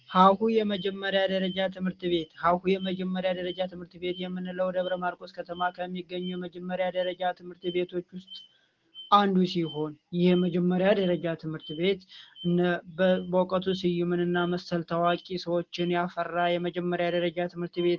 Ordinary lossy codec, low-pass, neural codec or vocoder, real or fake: Opus, 16 kbps; 7.2 kHz; none; real